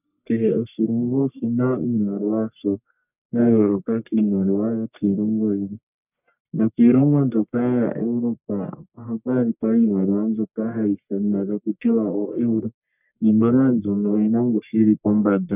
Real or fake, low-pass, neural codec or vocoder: fake; 3.6 kHz; codec, 44.1 kHz, 1.7 kbps, Pupu-Codec